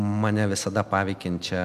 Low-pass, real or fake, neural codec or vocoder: 14.4 kHz; real; none